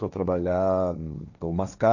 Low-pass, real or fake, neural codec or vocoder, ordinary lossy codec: 7.2 kHz; fake; codec, 16 kHz, 1.1 kbps, Voila-Tokenizer; none